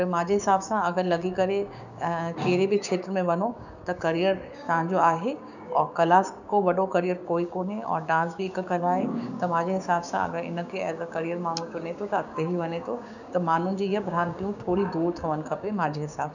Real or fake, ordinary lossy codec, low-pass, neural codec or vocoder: fake; none; 7.2 kHz; codec, 16 kHz, 6 kbps, DAC